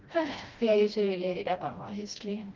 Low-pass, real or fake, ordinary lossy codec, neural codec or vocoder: 7.2 kHz; fake; Opus, 24 kbps; codec, 16 kHz, 1 kbps, FreqCodec, smaller model